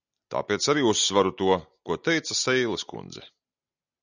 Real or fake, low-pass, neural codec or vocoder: real; 7.2 kHz; none